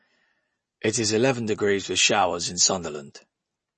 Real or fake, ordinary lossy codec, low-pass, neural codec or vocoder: real; MP3, 32 kbps; 10.8 kHz; none